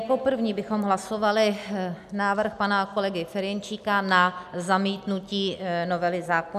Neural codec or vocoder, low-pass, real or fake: none; 14.4 kHz; real